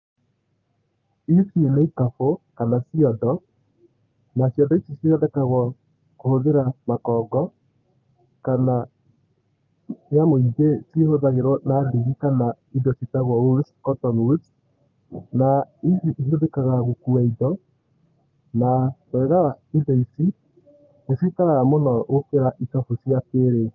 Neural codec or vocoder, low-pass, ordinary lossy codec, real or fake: codec, 44.1 kHz, 7.8 kbps, Pupu-Codec; 7.2 kHz; Opus, 32 kbps; fake